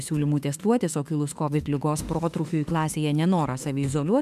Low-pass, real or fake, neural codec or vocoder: 14.4 kHz; fake; autoencoder, 48 kHz, 32 numbers a frame, DAC-VAE, trained on Japanese speech